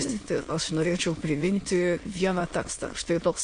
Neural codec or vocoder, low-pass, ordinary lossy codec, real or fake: autoencoder, 22.05 kHz, a latent of 192 numbers a frame, VITS, trained on many speakers; 9.9 kHz; AAC, 48 kbps; fake